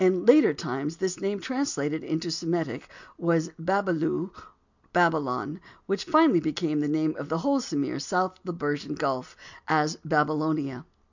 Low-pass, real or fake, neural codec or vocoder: 7.2 kHz; real; none